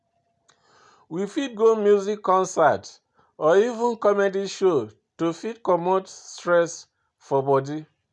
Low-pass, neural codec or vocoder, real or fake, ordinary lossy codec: 10.8 kHz; none; real; none